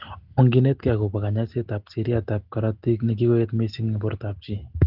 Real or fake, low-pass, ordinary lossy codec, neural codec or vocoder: real; 5.4 kHz; Opus, 16 kbps; none